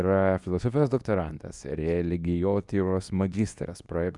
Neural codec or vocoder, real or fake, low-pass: codec, 24 kHz, 0.9 kbps, WavTokenizer, medium speech release version 2; fake; 10.8 kHz